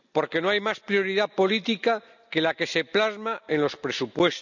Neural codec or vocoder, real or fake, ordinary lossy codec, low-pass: none; real; none; 7.2 kHz